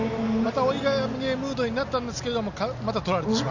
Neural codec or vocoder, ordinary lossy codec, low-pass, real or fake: none; none; 7.2 kHz; real